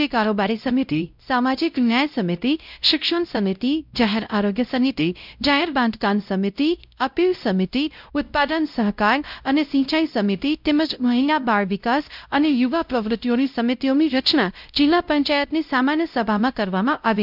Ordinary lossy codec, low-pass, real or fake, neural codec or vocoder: none; 5.4 kHz; fake; codec, 16 kHz, 0.5 kbps, X-Codec, WavLM features, trained on Multilingual LibriSpeech